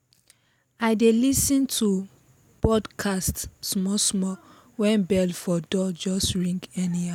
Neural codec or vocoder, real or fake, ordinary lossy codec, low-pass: none; real; none; 19.8 kHz